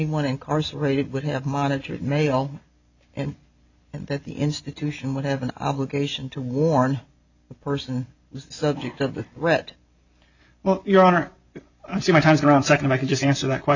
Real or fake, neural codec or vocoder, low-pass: real; none; 7.2 kHz